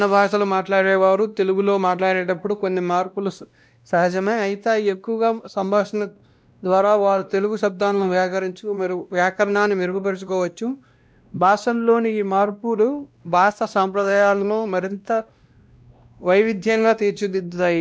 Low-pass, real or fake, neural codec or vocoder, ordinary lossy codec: none; fake; codec, 16 kHz, 1 kbps, X-Codec, WavLM features, trained on Multilingual LibriSpeech; none